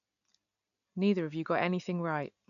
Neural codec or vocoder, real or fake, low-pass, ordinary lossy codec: none; real; 7.2 kHz; none